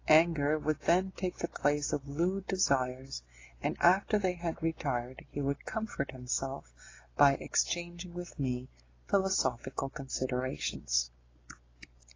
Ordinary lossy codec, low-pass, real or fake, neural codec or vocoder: AAC, 32 kbps; 7.2 kHz; real; none